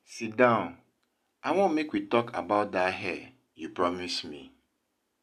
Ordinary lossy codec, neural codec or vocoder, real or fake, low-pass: none; none; real; 14.4 kHz